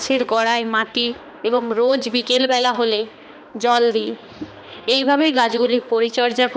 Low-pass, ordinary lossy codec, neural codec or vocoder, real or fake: none; none; codec, 16 kHz, 2 kbps, X-Codec, HuBERT features, trained on balanced general audio; fake